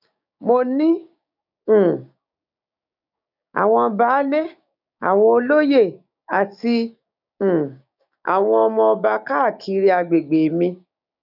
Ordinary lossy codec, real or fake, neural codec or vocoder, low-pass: none; fake; codec, 16 kHz, 6 kbps, DAC; 5.4 kHz